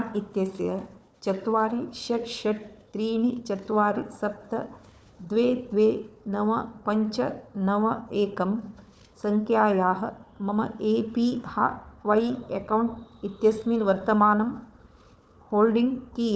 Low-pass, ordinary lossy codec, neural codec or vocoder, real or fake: none; none; codec, 16 kHz, 4 kbps, FunCodec, trained on Chinese and English, 50 frames a second; fake